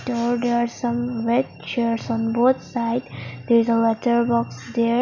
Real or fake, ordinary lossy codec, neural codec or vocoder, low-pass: real; none; none; 7.2 kHz